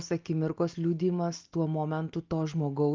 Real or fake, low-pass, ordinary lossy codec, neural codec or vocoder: real; 7.2 kHz; Opus, 24 kbps; none